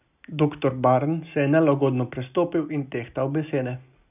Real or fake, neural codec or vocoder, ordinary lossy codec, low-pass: real; none; none; 3.6 kHz